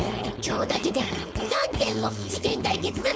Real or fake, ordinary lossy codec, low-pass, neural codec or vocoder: fake; none; none; codec, 16 kHz, 4.8 kbps, FACodec